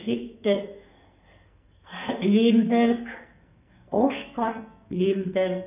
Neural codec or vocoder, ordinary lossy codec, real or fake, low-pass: codec, 44.1 kHz, 2.6 kbps, SNAC; none; fake; 3.6 kHz